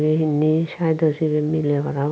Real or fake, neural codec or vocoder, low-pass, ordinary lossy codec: real; none; none; none